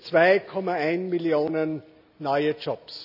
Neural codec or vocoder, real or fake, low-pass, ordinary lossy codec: none; real; 5.4 kHz; none